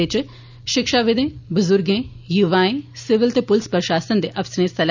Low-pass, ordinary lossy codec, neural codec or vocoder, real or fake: 7.2 kHz; none; none; real